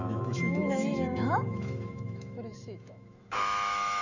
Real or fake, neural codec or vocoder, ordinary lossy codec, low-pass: fake; autoencoder, 48 kHz, 128 numbers a frame, DAC-VAE, trained on Japanese speech; none; 7.2 kHz